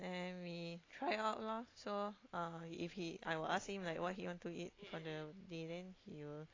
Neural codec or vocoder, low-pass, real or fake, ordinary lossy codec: none; 7.2 kHz; real; AAC, 32 kbps